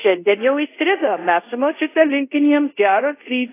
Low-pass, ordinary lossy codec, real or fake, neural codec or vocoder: 3.6 kHz; AAC, 24 kbps; fake; codec, 24 kHz, 0.5 kbps, DualCodec